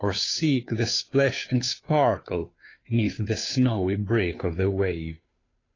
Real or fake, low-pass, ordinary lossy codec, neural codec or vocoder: fake; 7.2 kHz; AAC, 32 kbps; codec, 16 kHz, 2 kbps, FunCodec, trained on Chinese and English, 25 frames a second